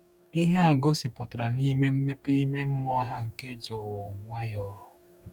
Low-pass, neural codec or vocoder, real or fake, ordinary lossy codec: 19.8 kHz; codec, 44.1 kHz, 2.6 kbps, DAC; fake; none